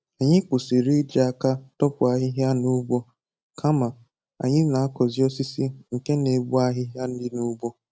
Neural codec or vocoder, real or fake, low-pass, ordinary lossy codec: none; real; none; none